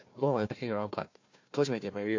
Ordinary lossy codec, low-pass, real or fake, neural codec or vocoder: MP3, 48 kbps; 7.2 kHz; fake; codec, 16 kHz, 1 kbps, FunCodec, trained on Chinese and English, 50 frames a second